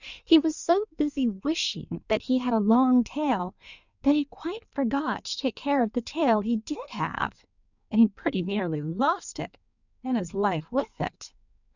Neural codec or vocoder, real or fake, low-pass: codec, 16 kHz in and 24 kHz out, 1.1 kbps, FireRedTTS-2 codec; fake; 7.2 kHz